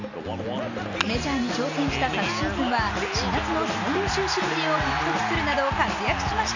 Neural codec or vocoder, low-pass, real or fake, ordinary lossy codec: none; 7.2 kHz; real; none